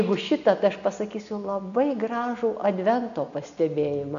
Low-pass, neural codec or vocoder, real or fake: 7.2 kHz; none; real